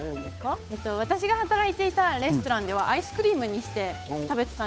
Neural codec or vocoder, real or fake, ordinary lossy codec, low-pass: codec, 16 kHz, 8 kbps, FunCodec, trained on Chinese and English, 25 frames a second; fake; none; none